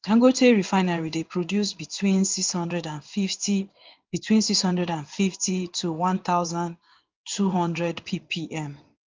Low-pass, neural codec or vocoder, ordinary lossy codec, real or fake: 7.2 kHz; codec, 16 kHz in and 24 kHz out, 1 kbps, XY-Tokenizer; Opus, 24 kbps; fake